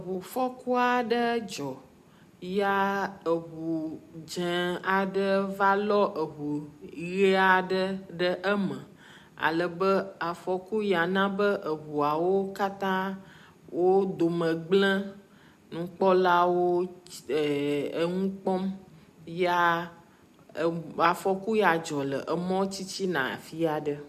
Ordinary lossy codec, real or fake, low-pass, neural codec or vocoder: MP3, 96 kbps; real; 14.4 kHz; none